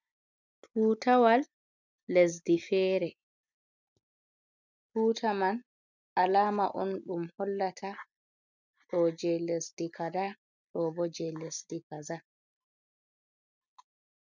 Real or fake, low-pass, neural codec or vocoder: real; 7.2 kHz; none